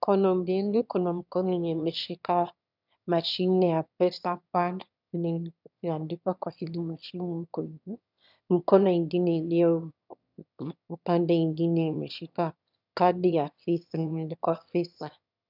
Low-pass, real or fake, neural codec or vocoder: 5.4 kHz; fake; autoencoder, 22.05 kHz, a latent of 192 numbers a frame, VITS, trained on one speaker